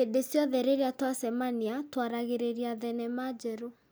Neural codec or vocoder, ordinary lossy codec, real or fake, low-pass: none; none; real; none